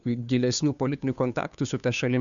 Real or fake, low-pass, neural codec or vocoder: fake; 7.2 kHz; codec, 16 kHz, 2 kbps, FunCodec, trained on Chinese and English, 25 frames a second